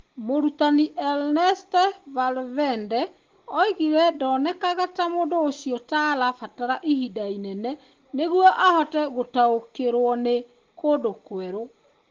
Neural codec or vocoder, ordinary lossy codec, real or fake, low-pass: none; Opus, 16 kbps; real; 7.2 kHz